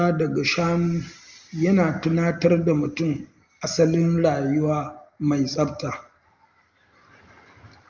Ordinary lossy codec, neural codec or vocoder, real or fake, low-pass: Opus, 32 kbps; none; real; 7.2 kHz